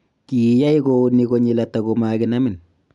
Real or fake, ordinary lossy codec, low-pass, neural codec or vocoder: real; none; 10.8 kHz; none